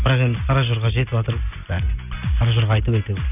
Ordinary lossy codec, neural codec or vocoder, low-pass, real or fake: none; none; 3.6 kHz; real